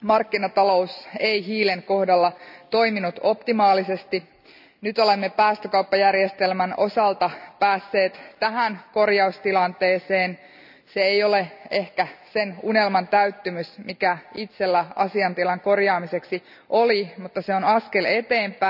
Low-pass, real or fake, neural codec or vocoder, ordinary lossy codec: 5.4 kHz; real; none; none